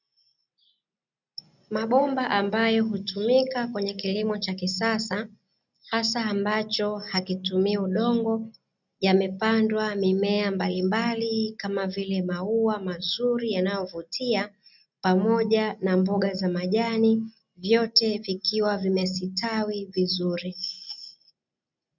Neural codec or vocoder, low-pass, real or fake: none; 7.2 kHz; real